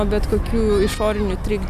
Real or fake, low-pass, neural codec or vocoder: real; 14.4 kHz; none